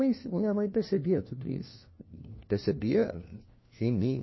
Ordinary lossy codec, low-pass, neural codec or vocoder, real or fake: MP3, 24 kbps; 7.2 kHz; codec, 16 kHz, 1 kbps, FunCodec, trained on LibriTTS, 50 frames a second; fake